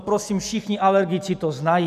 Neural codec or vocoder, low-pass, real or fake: none; 14.4 kHz; real